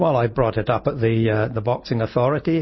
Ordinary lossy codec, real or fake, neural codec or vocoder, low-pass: MP3, 24 kbps; real; none; 7.2 kHz